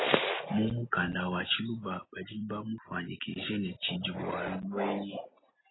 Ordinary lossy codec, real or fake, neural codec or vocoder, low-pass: AAC, 16 kbps; real; none; 7.2 kHz